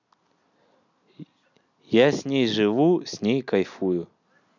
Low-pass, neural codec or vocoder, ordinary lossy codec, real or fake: 7.2 kHz; none; none; real